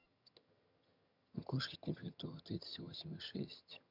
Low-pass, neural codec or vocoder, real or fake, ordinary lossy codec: 5.4 kHz; vocoder, 22.05 kHz, 80 mel bands, HiFi-GAN; fake; none